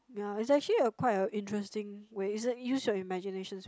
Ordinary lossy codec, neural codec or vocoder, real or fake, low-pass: none; none; real; none